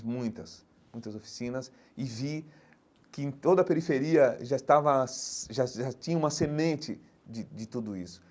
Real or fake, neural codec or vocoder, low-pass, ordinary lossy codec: real; none; none; none